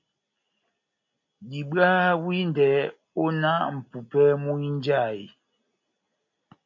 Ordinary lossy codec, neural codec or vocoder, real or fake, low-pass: MP3, 48 kbps; none; real; 7.2 kHz